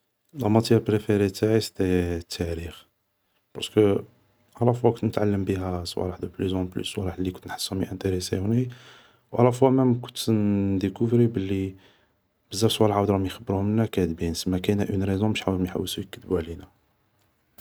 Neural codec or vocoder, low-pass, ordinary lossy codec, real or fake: none; none; none; real